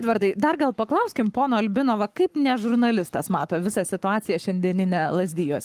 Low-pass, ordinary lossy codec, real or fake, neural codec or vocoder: 14.4 kHz; Opus, 32 kbps; fake; codec, 44.1 kHz, 7.8 kbps, Pupu-Codec